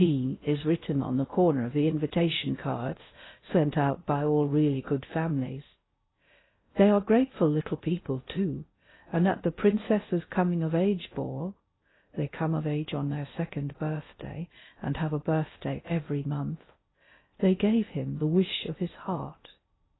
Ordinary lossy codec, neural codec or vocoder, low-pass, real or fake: AAC, 16 kbps; codec, 16 kHz in and 24 kHz out, 0.6 kbps, FocalCodec, streaming, 4096 codes; 7.2 kHz; fake